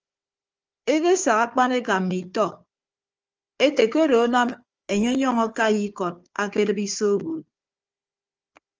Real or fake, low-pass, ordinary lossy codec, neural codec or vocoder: fake; 7.2 kHz; Opus, 24 kbps; codec, 16 kHz, 4 kbps, FunCodec, trained on Chinese and English, 50 frames a second